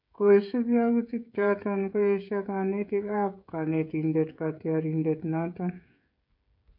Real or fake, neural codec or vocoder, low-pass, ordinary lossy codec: fake; codec, 16 kHz, 16 kbps, FreqCodec, smaller model; 5.4 kHz; none